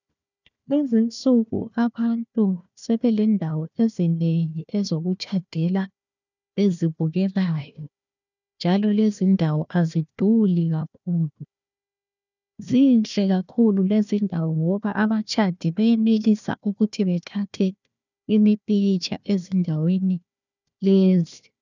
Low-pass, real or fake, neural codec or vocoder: 7.2 kHz; fake; codec, 16 kHz, 1 kbps, FunCodec, trained on Chinese and English, 50 frames a second